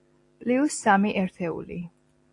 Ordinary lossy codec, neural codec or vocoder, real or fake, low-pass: AAC, 48 kbps; none; real; 10.8 kHz